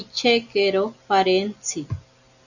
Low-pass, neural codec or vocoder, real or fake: 7.2 kHz; none; real